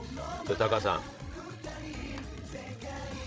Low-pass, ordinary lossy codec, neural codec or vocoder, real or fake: none; none; codec, 16 kHz, 16 kbps, FreqCodec, larger model; fake